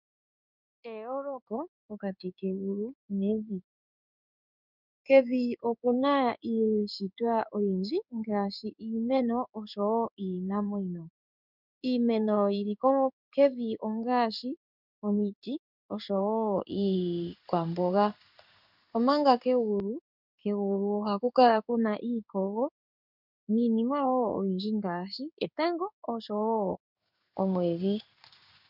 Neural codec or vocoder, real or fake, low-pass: codec, 16 kHz in and 24 kHz out, 1 kbps, XY-Tokenizer; fake; 5.4 kHz